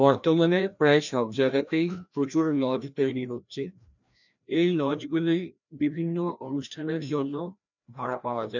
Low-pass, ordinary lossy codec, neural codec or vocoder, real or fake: 7.2 kHz; none; codec, 16 kHz, 1 kbps, FreqCodec, larger model; fake